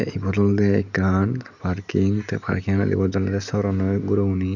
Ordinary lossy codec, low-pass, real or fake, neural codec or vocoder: none; 7.2 kHz; real; none